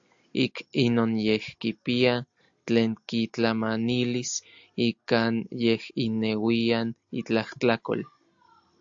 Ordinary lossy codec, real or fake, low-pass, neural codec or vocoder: AAC, 64 kbps; real; 7.2 kHz; none